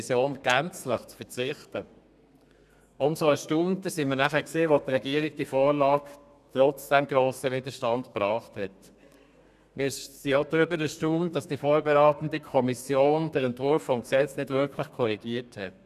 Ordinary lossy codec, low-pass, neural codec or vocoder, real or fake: none; 14.4 kHz; codec, 32 kHz, 1.9 kbps, SNAC; fake